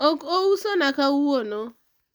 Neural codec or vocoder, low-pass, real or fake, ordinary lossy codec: none; none; real; none